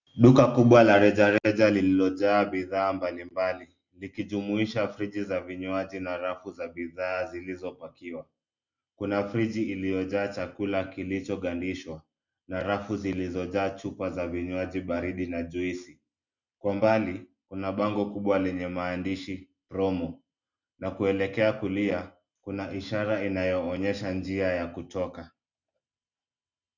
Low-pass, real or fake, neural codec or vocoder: 7.2 kHz; real; none